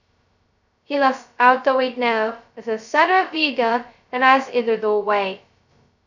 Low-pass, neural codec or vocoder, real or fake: 7.2 kHz; codec, 16 kHz, 0.2 kbps, FocalCodec; fake